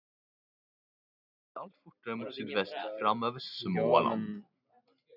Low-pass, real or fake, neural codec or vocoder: 5.4 kHz; real; none